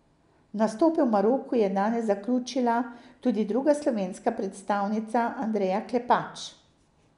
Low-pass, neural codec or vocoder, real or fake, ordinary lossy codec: 10.8 kHz; none; real; none